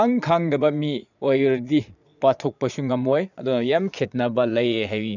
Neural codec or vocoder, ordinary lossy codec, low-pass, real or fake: vocoder, 22.05 kHz, 80 mel bands, Vocos; none; 7.2 kHz; fake